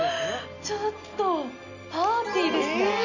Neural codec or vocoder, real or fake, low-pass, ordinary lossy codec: none; real; 7.2 kHz; none